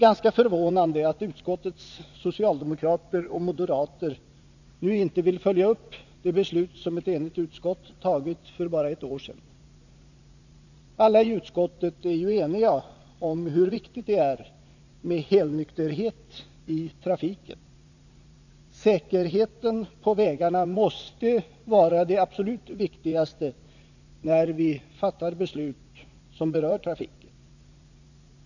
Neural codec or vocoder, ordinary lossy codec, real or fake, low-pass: vocoder, 44.1 kHz, 80 mel bands, Vocos; none; fake; 7.2 kHz